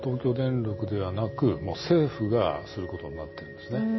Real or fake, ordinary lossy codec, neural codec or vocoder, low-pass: real; MP3, 24 kbps; none; 7.2 kHz